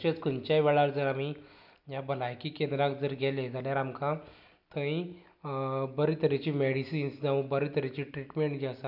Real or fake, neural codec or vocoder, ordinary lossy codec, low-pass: real; none; none; 5.4 kHz